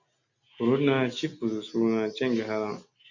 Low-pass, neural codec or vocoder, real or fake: 7.2 kHz; none; real